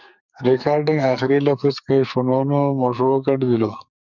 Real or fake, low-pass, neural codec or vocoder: fake; 7.2 kHz; codec, 44.1 kHz, 2.6 kbps, SNAC